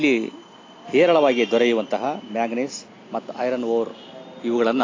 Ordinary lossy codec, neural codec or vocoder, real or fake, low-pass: AAC, 32 kbps; none; real; 7.2 kHz